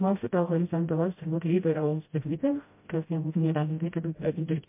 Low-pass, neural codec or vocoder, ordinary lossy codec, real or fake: 3.6 kHz; codec, 16 kHz, 0.5 kbps, FreqCodec, smaller model; MP3, 24 kbps; fake